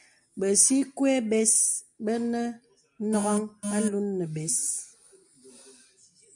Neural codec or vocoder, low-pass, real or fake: none; 10.8 kHz; real